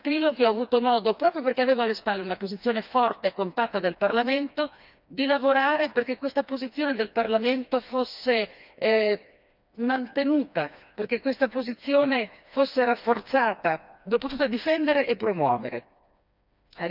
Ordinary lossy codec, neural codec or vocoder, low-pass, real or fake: none; codec, 16 kHz, 2 kbps, FreqCodec, smaller model; 5.4 kHz; fake